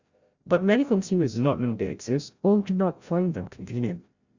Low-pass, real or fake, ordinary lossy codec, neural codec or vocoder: 7.2 kHz; fake; Opus, 64 kbps; codec, 16 kHz, 0.5 kbps, FreqCodec, larger model